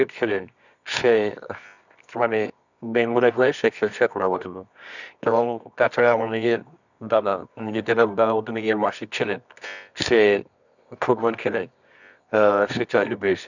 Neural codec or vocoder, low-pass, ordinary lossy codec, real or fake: codec, 24 kHz, 0.9 kbps, WavTokenizer, medium music audio release; 7.2 kHz; none; fake